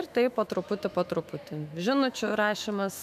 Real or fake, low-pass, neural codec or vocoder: fake; 14.4 kHz; autoencoder, 48 kHz, 128 numbers a frame, DAC-VAE, trained on Japanese speech